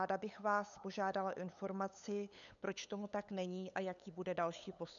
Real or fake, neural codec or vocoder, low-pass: fake; codec, 16 kHz, 8 kbps, FunCodec, trained on LibriTTS, 25 frames a second; 7.2 kHz